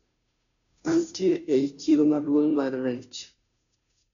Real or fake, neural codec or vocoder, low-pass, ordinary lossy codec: fake; codec, 16 kHz, 0.5 kbps, FunCodec, trained on Chinese and English, 25 frames a second; 7.2 kHz; none